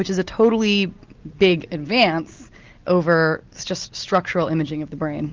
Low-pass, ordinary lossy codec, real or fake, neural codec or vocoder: 7.2 kHz; Opus, 24 kbps; real; none